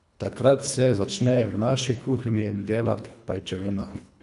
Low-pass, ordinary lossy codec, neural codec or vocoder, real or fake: 10.8 kHz; none; codec, 24 kHz, 1.5 kbps, HILCodec; fake